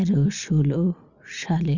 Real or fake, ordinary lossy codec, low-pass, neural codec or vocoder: real; Opus, 64 kbps; 7.2 kHz; none